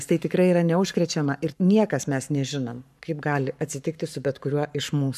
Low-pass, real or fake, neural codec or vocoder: 14.4 kHz; fake; codec, 44.1 kHz, 7.8 kbps, Pupu-Codec